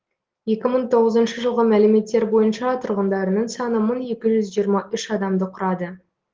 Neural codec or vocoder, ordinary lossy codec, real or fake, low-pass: none; Opus, 16 kbps; real; 7.2 kHz